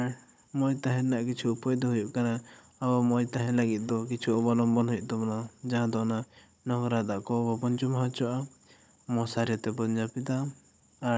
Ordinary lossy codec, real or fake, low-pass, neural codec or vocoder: none; fake; none; codec, 16 kHz, 16 kbps, FunCodec, trained on Chinese and English, 50 frames a second